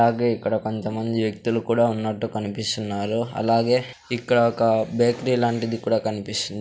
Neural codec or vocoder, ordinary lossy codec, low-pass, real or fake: none; none; none; real